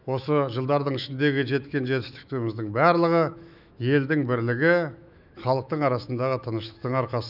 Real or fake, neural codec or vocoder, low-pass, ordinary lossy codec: fake; autoencoder, 48 kHz, 128 numbers a frame, DAC-VAE, trained on Japanese speech; 5.4 kHz; none